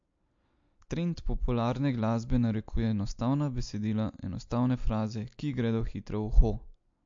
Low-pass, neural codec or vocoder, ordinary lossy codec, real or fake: 7.2 kHz; none; MP3, 48 kbps; real